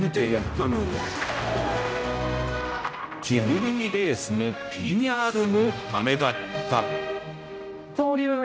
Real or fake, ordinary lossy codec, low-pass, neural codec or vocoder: fake; none; none; codec, 16 kHz, 0.5 kbps, X-Codec, HuBERT features, trained on general audio